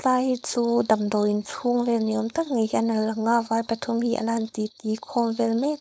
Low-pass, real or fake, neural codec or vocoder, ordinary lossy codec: none; fake; codec, 16 kHz, 4.8 kbps, FACodec; none